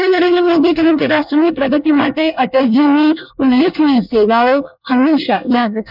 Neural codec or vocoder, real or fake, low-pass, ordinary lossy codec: codec, 24 kHz, 1 kbps, SNAC; fake; 5.4 kHz; none